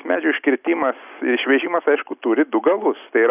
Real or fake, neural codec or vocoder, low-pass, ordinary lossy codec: real; none; 3.6 kHz; AAC, 32 kbps